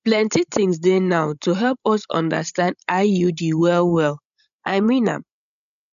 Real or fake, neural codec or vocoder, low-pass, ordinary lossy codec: fake; codec, 16 kHz, 16 kbps, FreqCodec, larger model; 7.2 kHz; none